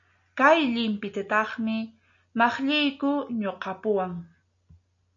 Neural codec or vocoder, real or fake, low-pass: none; real; 7.2 kHz